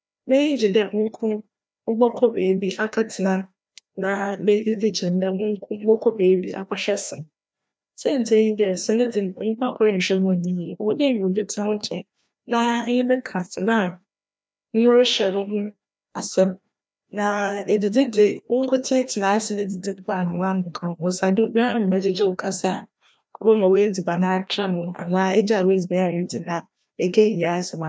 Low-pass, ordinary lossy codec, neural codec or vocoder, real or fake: none; none; codec, 16 kHz, 1 kbps, FreqCodec, larger model; fake